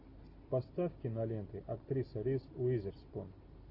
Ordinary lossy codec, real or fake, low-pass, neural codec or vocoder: AAC, 32 kbps; real; 5.4 kHz; none